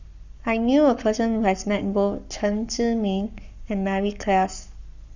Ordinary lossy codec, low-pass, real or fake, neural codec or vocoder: none; 7.2 kHz; fake; codec, 44.1 kHz, 7.8 kbps, Pupu-Codec